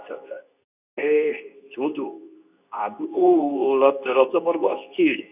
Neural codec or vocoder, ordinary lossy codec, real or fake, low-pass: codec, 24 kHz, 0.9 kbps, WavTokenizer, medium speech release version 2; none; fake; 3.6 kHz